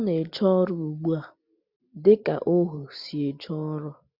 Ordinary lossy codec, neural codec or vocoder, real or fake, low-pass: none; none; real; 5.4 kHz